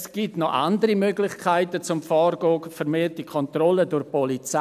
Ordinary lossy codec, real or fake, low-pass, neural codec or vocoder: none; real; 14.4 kHz; none